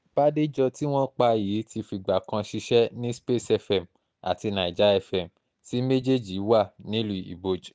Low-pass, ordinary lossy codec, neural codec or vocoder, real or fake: none; none; none; real